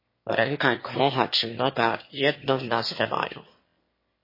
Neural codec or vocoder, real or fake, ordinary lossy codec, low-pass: autoencoder, 22.05 kHz, a latent of 192 numbers a frame, VITS, trained on one speaker; fake; MP3, 24 kbps; 5.4 kHz